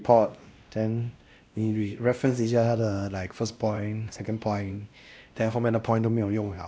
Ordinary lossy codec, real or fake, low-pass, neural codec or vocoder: none; fake; none; codec, 16 kHz, 1 kbps, X-Codec, WavLM features, trained on Multilingual LibriSpeech